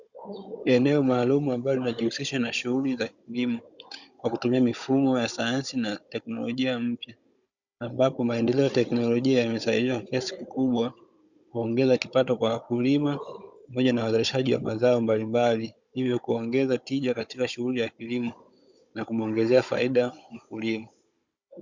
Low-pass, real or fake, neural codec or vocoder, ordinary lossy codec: 7.2 kHz; fake; codec, 16 kHz, 16 kbps, FunCodec, trained on Chinese and English, 50 frames a second; Opus, 64 kbps